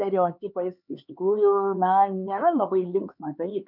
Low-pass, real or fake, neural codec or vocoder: 5.4 kHz; fake; codec, 16 kHz, 4 kbps, X-Codec, HuBERT features, trained on LibriSpeech